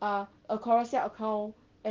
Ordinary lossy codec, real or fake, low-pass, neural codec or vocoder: Opus, 16 kbps; real; 7.2 kHz; none